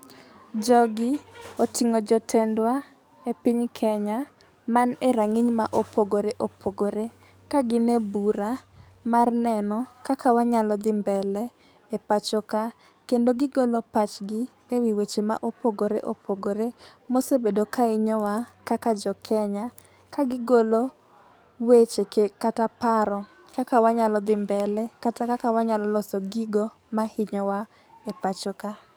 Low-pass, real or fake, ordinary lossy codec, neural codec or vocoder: none; fake; none; codec, 44.1 kHz, 7.8 kbps, DAC